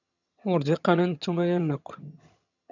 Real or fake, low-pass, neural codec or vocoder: fake; 7.2 kHz; vocoder, 22.05 kHz, 80 mel bands, HiFi-GAN